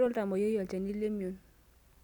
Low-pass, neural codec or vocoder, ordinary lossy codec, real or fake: 19.8 kHz; none; none; real